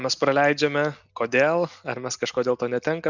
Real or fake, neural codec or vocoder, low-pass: real; none; 7.2 kHz